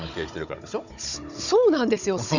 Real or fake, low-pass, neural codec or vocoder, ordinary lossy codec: fake; 7.2 kHz; codec, 16 kHz, 16 kbps, FunCodec, trained on Chinese and English, 50 frames a second; none